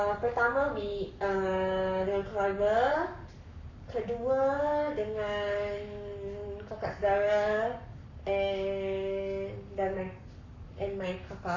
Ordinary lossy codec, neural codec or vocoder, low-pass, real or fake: none; codec, 44.1 kHz, 7.8 kbps, Pupu-Codec; 7.2 kHz; fake